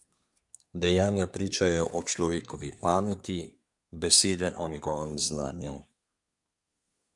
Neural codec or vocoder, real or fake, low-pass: codec, 24 kHz, 1 kbps, SNAC; fake; 10.8 kHz